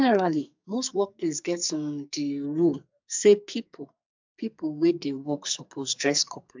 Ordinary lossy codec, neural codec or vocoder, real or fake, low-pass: MP3, 64 kbps; codec, 44.1 kHz, 2.6 kbps, SNAC; fake; 7.2 kHz